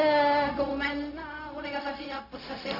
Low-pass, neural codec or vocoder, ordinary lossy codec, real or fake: 5.4 kHz; codec, 16 kHz, 0.4 kbps, LongCat-Audio-Codec; none; fake